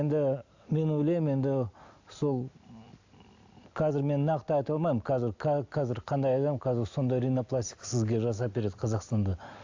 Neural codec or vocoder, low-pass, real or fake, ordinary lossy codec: none; 7.2 kHz; real; AAC, 48 kbps